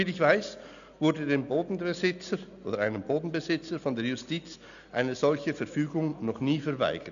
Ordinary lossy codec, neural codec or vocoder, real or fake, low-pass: none; none; real; 7.2 kHz